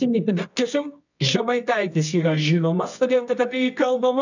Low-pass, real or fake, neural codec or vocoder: 7.2 kHz; fake; codec, 24 kHz, 0.9 kbps, WavTokenizer, medium music audio release